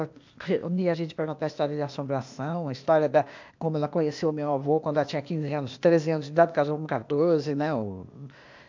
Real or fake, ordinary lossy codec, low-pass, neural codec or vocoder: fake; MP3, 64 kbps; 7.2 kHz; codec, 16 kHz, 0.8 kbps, ZipCodec